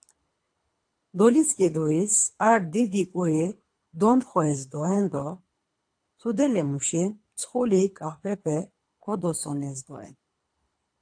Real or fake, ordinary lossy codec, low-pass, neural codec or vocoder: fake; AAC, 64 kbps; 9.9 kHz; codec, 24 kHz, 3 kbps, HILCodec